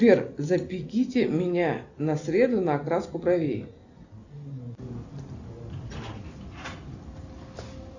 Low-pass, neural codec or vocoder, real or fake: 7.2 kHz; none; real